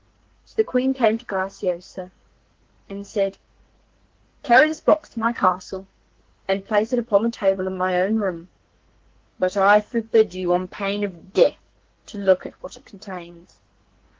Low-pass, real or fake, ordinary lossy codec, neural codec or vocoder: 7.2 kHz; fake; Opus, 16 kbps; codec, 44.1 kHz, 2.6 kbps, SNAC